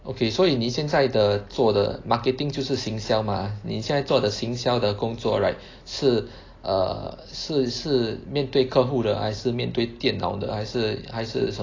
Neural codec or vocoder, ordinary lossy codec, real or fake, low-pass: none; AAC, 32 kbps; real; 7.2 kHz